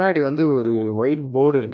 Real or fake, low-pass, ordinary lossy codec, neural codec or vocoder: fake; none; none; codec, 16 kHz, 1 kbps, FreqCodec, larger model